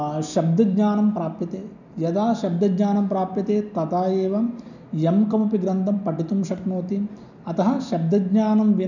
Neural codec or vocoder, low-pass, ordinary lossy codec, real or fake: none; 7.2 kHz; none; real